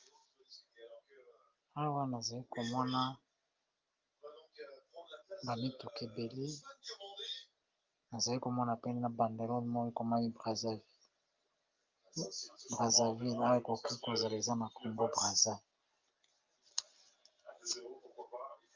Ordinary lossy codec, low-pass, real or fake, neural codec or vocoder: Opus, 24 kbps; 7.2 kHz; real; none